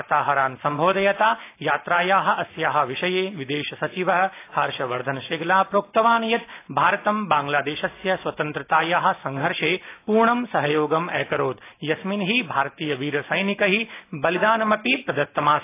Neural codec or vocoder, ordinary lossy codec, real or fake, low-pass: none; AAC, 24 kbps; real; 3.6 kHz